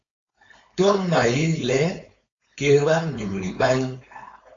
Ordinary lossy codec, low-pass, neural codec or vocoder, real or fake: AAC, 32 kbps; 7.2 kHz; codec, 16 kHz, 4.8 kbps, FACodec; fake